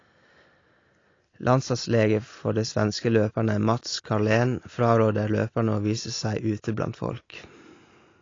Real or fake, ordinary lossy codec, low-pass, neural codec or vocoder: real; AAC, 32 kbps; 7.2 kHz; none